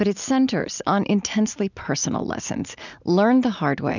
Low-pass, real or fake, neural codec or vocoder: 7.2 kHz; real; none